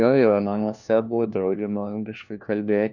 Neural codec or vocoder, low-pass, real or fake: codec, 16 kHz, 1 kbps, FunCodec, trained on LibriTTS, 50 frames a second; 7.2 kHz; fake